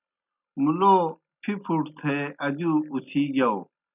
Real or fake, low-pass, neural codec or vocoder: real; 3.6 kHz; none